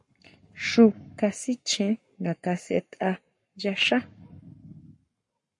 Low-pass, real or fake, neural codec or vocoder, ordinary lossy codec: 10.8 kHz; fake; codec, 44.1 kHz, 7.8 kbps, Pupu-Codec; MP3, 48 kbps